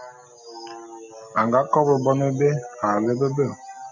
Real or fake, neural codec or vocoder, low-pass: real; none; 7.2 kHz